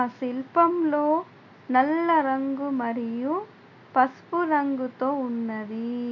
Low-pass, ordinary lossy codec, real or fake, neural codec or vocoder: 7.2 kHz; MP3, 48 kbps; real; none